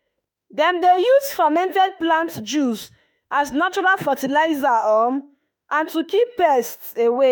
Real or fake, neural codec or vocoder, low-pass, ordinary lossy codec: fake; autoencoder, 48 kHz, 32 numbers a frame, DAC-VAE, trained on Japanese speech; none; none